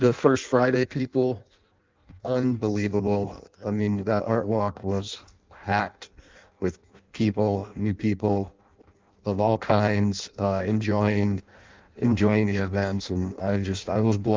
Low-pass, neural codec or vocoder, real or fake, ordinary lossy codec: 7.2 kHz; codec, 16 kHz in and 24 kHz out, 0.6 kbps, FireRedTTS-2 codec; fake; Opus, 24 kbps